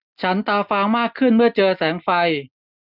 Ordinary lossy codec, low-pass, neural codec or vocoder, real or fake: none; 5.4 kHz; none; real